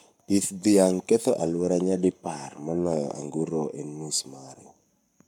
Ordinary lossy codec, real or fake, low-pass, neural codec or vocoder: none; fake; 19.8 kHz; codec, 44.1 kHz, 7.8 kbps, Pupu-Codec